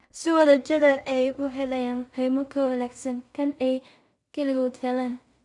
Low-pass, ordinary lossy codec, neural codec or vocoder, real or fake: 10.8 kHz; none; codec, 16 kHz in and 24 kHz out, 0.4 kbps, LongCat-Audio-Codec, two codebook decoder; fake